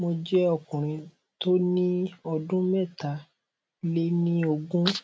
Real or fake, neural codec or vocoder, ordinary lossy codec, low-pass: real; none; none; none